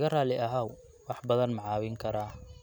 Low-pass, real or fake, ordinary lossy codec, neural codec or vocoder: none; real; none; none